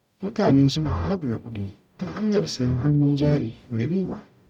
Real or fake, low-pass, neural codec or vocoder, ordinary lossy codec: fake; 19.8 kHz; codec, 44.1 kHz, 0.9 kbps, DAC; none